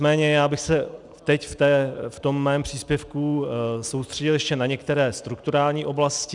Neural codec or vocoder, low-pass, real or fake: none; 10.8 kHz; real